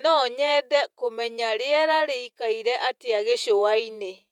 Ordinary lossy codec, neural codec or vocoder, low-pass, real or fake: MP3, 96 kbps; vocoder, 48 kHz, 128 mel bands, Vocos; 19.8 kHz; fake